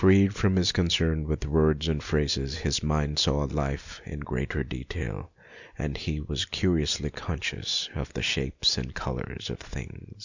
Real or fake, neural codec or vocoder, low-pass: real; none; 7.2 kHz